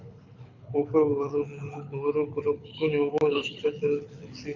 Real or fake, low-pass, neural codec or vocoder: fake; 7.2 kHz; codec, 24 kHz, 6 kbps, HILCodec